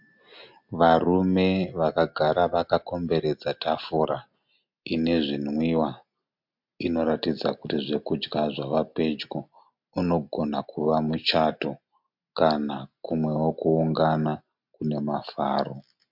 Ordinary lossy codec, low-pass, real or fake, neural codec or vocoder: MP3, 48 kbps; 5.4 kHz; real; none